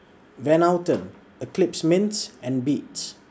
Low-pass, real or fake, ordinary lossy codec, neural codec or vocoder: none; real; none; none